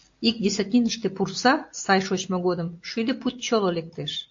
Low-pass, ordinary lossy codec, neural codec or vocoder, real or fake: 7.2 kHz; AAC, 48 kbps; none; real